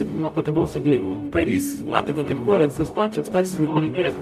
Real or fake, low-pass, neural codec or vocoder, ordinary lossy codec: fake; 14.4 kHz; codec, 44.1 kHz, 0.9 kbps, DAC; MP3, 96 kbps